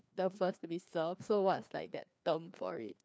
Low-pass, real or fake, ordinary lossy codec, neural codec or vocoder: none; fake; none; codec, 16 kHz, 2 kbps, FreqCodec, larger model